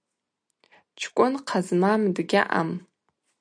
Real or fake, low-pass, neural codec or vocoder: real; 9.9 kHz; none